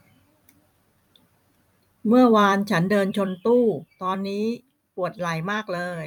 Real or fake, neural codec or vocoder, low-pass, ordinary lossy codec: fake; vocoder, 44.1 kHz, 128 mel bands every 256 samples, BigVGAN v2; 19.8 kHz; none